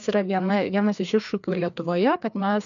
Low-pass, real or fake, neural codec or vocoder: 7.2 kHz; fake; codec, 16 kHz, 2 kbps, FreqCodec, larger model